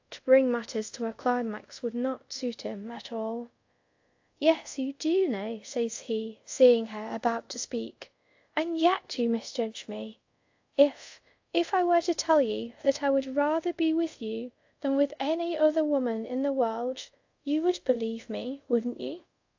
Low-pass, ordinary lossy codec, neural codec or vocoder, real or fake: 7.2 kHz; AAC, 48 kbps; codec, 24 kHz, 0.5 kbps, DualCodec; fake